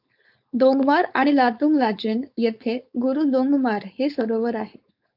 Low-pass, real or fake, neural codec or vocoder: 5.4 kHz; fake; codec, 16 kHz, 4.8 kbps, FACodec